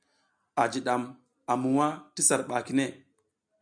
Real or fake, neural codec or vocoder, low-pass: real; none; 9.9 kHz